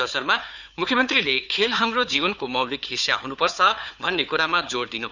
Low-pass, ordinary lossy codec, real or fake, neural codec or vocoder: 7.2 kHz; none; fake; codec, 16 kHz, 4 kbps, FunCodec, trained on Chinese and English, 50 frames a second